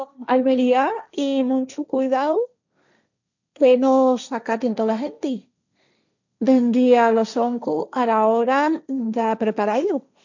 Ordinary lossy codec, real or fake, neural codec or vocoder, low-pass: none; fake; codec, 16 kHz, 1.1 kbps, Voila-Tokenizer; 7.2 kHz